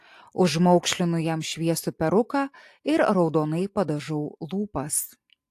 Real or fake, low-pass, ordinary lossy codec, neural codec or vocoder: real; 14.4 kHz; AAC, 64 kbps; none